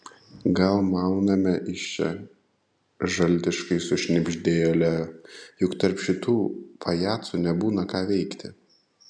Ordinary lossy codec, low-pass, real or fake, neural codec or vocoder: MP3, 96 kbps; 9.9 kHz; real; none